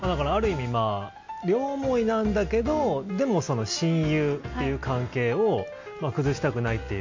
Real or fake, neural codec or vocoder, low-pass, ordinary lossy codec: real; none; 7.2 kHz; MP3, 48 kbps